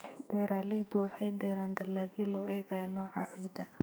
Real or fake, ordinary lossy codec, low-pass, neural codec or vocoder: fake; none; none; codec, 44.1 kHz, 2.6 kbps, SNAC